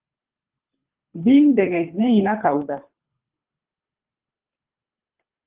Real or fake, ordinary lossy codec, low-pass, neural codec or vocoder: fake; Opus, 32 kbps; 3.6 kHz; codec, 24 kHz, 3 kbps, HILCodec